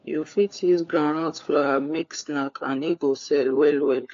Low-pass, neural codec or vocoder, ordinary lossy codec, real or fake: 7.2 kHz; codec, 16 kHz, 4 kbps, FunCodec, trained on LibriTTS, 50 frames a second; AAC, 48 kbps; fake